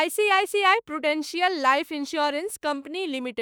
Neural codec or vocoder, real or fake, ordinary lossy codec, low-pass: autoencoder, 48 kHz, 32 numbers a frame, DAC-VAE, trained on Japanese speech; fake; none; none